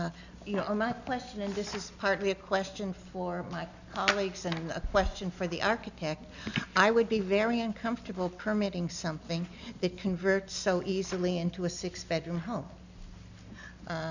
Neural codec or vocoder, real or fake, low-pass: none; real; 7.2 kHz